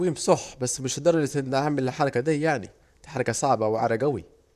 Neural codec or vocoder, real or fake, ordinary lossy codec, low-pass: vocoder, 44.1 kHz, 128 mel bands, Pupu-Vocoder; fake; none; 14.4 kHz